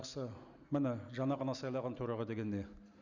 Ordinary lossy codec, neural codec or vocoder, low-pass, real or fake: Opus, 64 kbps; none; 7.2 kHz; real